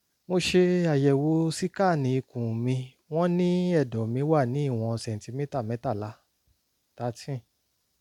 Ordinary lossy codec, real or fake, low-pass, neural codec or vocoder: MP3, 96 kbps; real; 19.8 kHz; none